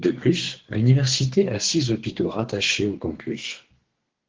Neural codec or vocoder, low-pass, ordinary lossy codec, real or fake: codec, 32 kHz, 1.9 kbps, SNAC; 7.2 kHz; Opus, 16 kbps; fake